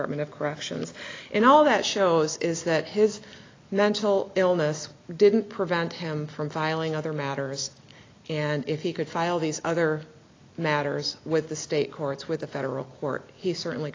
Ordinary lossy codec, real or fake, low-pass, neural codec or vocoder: AAC, 32 kbps; real; 7.2 kHz; none